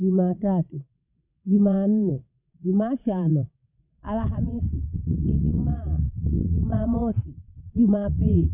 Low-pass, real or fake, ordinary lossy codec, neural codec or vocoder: 3.6 kHz; fake; none; vocoder, 22.05 kHz, 80 mel bands, WaveNeXt